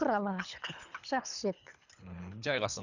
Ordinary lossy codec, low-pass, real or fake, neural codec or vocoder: none; 7.2 kHz; fake; codec, 24 kHz, 3 kbps, HILCodec